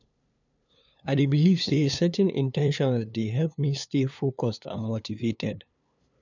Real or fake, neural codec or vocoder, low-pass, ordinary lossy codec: fake; codec, 16 kHz, 2 kbps, FunCodec, trained on LibriTTS, 25 frames a second; 7.2 kHz; none